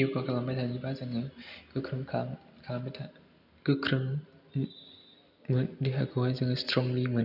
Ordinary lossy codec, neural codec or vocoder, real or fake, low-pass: none; none; real; 5.4 kHz